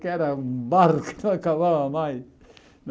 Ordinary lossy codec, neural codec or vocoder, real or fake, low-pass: none; none; real; none